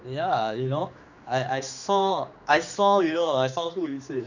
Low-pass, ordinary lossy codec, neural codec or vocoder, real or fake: 7.2 kHz; none; codec, 16 kHz, 2 kbps, X-Codec, HuBERT features, trained on general audio; fake